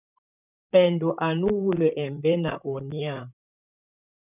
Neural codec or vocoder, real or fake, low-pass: vocoder, 44.1 kHz, 128 mel bands, Pupu-Vocoder; fake; 3.6 kHz